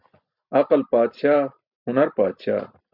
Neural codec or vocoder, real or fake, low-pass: none; real; 5.4 kHz